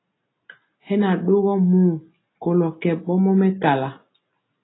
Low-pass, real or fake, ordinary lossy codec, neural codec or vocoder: 7.2 kHz; real; AAC, 16 kbps; none